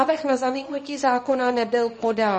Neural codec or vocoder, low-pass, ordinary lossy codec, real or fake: codec, 24 kHz, 0.9 kbps, WavTokenizer, small release; 10.8 kHz; MP3, 32 kbps; fake